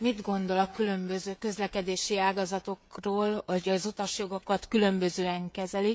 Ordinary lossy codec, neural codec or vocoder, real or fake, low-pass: none; codec, 16 kHz, 16 kbps, FreqCodec, smaller model; fake; none